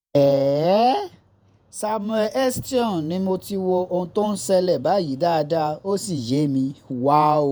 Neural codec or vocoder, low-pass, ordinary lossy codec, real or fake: vocoder, 48 kHz, 128 mel bands, Vocos; none; none; fake